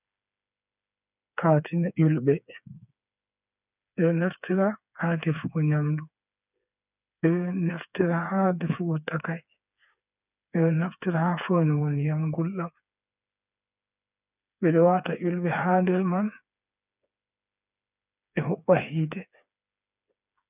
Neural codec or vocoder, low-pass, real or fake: codec, 16 kHz, 4 kbps, FreqCodec, smaller model; 3.6 kHz; fake